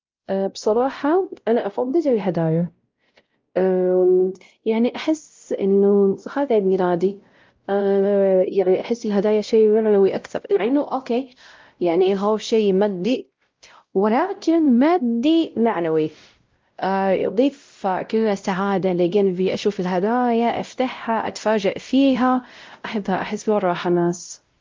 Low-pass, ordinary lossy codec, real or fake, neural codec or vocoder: 7.2 kHz; Opus, 32 kbps; fake; codec, 16 kHz, 0.5 kbps, X-Codec, WavLM features, trained on Multilingual LibriSpeech